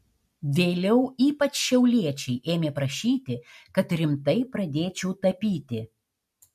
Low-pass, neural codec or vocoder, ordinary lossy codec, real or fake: 14.4 kHz; none; MP3, 64 kbps; real